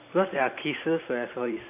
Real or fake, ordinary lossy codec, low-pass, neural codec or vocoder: fake; none; 3.6 kHz; vocoder, 44.1 kHz, 128 mel bands every 512 samples, BigVGAN v2